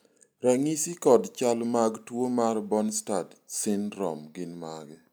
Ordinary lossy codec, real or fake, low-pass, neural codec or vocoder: none; real; none; none